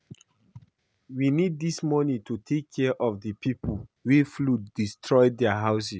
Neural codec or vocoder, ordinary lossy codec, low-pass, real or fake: none; none; none; real